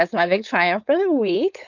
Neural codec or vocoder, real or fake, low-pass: codec, 16 kHz, 4.8 kbps, FACodec; fake; 7.2 kHz